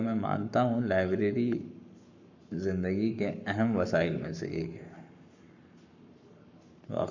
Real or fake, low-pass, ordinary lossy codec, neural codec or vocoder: fake; 7.2 kHz; none; codec, 44.1 kHz, 7.8 kbps, Pupu-Codec